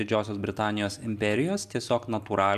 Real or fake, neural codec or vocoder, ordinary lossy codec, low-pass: real; none; AAC, 96 kbps; 14.4 kHz